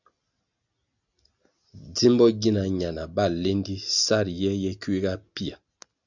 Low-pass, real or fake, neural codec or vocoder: 7.2 kHz; real; none